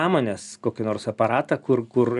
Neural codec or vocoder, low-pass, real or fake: none; 10.8 kHz; real